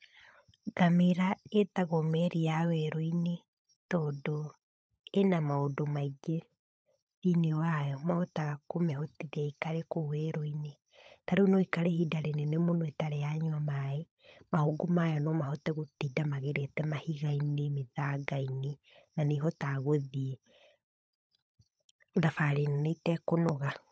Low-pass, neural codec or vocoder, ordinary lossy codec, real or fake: none; codec, 16 kHz, 16 kbps, FunCodec, trained on LibriTTS, 50 frames a second; none; fake